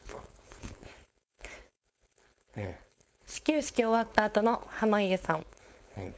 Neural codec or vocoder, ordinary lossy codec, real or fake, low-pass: codec, 16 kHz, 4.8 kbps, FACodec; none; fake; none